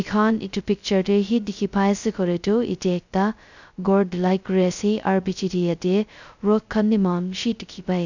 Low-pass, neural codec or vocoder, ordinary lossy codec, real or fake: 7.2 kHz; codec, 16 kHz, 0.2 kbps, FocalCodec; none; fake